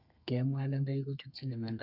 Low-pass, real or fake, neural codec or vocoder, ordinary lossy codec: 5.4 kHz; fake; codec, 32 kHz, 1.9 kbps, SNAC; none